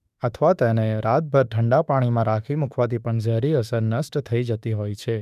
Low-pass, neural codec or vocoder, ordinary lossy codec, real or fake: 14.4 kHz; autoencoder, 48 kHz, 32 numbers a frame, DAC-VAE, trained on Japanese speech; none; fake